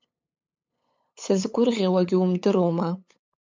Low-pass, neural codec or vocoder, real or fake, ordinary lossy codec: 7.2 kHz; codec, 16 kHz, 8 kbps, FunCodec, trained on LibriTTS, 25 frames a second; fake; MP3, 64 kbps